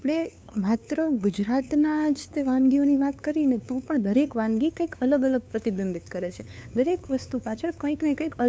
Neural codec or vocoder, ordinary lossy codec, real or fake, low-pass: codec, 16 kHz, 2 kbps, FunCodec, trained on LibriTTS, 25 frames a second; none; fake; none